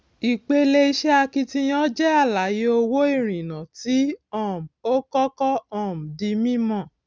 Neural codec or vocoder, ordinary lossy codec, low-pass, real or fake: none; none; none; real